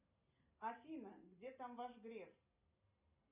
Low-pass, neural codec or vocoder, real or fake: 3.6 kHz; none; real